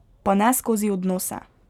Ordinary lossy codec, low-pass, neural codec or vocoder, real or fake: none; 19.8 kHz; none; real